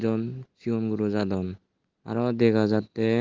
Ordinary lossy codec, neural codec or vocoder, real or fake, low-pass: Opus, 16 kbps; none; real; 7.2 kHz